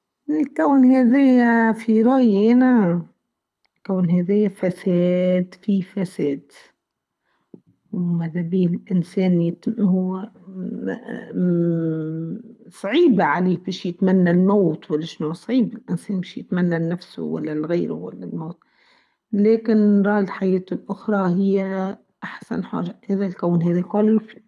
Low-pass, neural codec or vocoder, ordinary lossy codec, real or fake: none; codec, 24 kHz, 6 kbps, HILCodec; none; fake